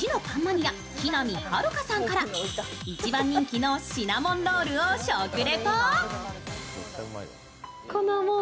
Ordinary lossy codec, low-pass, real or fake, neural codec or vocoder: none; none; real; none